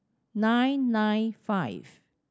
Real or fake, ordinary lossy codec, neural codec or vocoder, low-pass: real; none; none; none